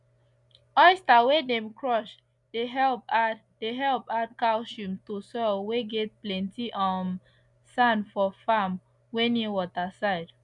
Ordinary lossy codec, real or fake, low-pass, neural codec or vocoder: none; real; 10.8 kHz; none